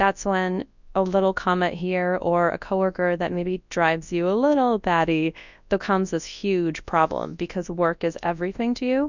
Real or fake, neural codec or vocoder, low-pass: fake; codec, 24 kHz, 0.9 kbps, WavTokenizer, large speech release; 7.2 kHz